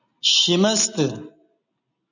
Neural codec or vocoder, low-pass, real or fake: none; 7.2 kHz; real